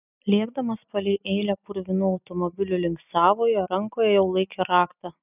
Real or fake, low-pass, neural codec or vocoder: real; 3.6 kHz; none